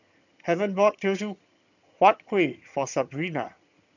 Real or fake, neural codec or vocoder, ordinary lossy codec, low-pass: fake; vocoder, 22.05 kHz, 80 mel bands, HiFi-GAN; none; 7.2 kHz